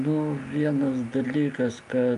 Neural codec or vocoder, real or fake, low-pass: none; real; 10.8 kHz